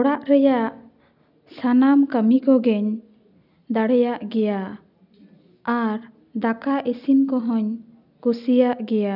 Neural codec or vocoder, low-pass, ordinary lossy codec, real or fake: none; 5.4 kHz; none; real